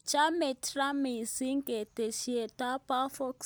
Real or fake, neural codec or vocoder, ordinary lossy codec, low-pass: real; none; none; none